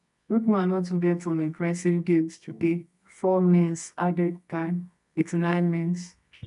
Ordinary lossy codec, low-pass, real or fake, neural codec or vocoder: MP3, 96 kbps; 10.8 kHz; fake; codec, 24 kHz, 0.9 kbps, WavTokenizer, medium music audio release